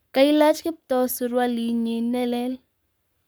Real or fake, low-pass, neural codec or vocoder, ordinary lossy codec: fake; none; codec, 44.1 kHz, 7.8 kbps, Pupu-Codec; none